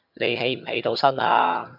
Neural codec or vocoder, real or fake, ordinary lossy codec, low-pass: vocoder, 22.05 kHz, 80 mel bands, HiFi-GAN; fake; AAC, 48 kbps; 5.4 kHz